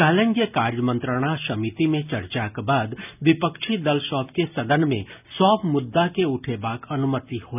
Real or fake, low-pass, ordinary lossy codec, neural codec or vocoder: real; 3.6 kHz; none; none